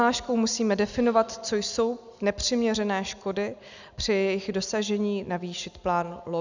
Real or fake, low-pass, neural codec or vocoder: real; 7.2 kHz; none